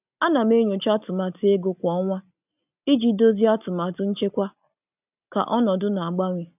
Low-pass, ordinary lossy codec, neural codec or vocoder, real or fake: 3.6 kHz; none; none; real